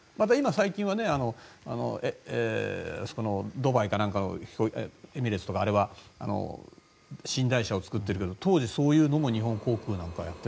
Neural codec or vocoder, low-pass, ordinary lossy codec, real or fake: none; none; none; real